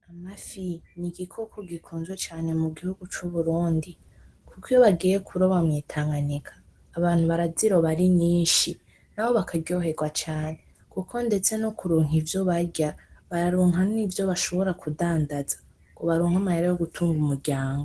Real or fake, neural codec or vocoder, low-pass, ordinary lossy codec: real; none; 10.8 kHz; Opus, 16 kbps